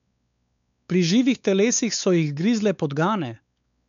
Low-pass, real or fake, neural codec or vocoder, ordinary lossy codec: 7.2 kHz; fake; codec, 16 kHz, 4 kbps, X-Codec, WavLM features, trained on Multilingual LibriSpeech; none